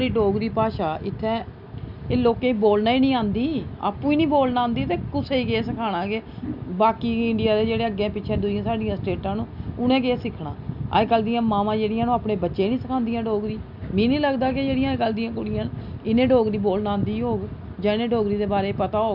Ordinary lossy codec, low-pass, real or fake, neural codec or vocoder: none; 5.4 kHz; real; none